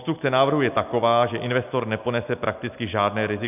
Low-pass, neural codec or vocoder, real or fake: 3.6 kHz; none; real